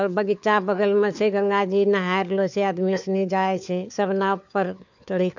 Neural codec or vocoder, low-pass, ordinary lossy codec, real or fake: codec, 16 kHz, 4 kbps, FunCodec, trained on LibriTTS, 50 frames a second; 7.2 kHz; none; fake